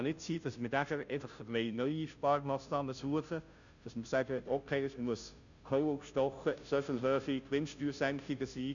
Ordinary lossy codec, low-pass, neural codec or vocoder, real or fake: none; 7.2 kHz; codec, 16 kHz, 0.5 kbps, FunCodec, trained on Chinese and English, 25 frames a second; fake